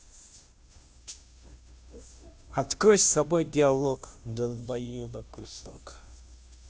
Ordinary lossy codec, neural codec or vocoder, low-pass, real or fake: none; codec, 16 kHz, 0.5 kbps, FunCodec, trained on Chinese and English, 25 frames a second; none; fake